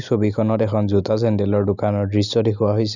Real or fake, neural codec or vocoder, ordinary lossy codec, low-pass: real; none; none; 7.2 kHz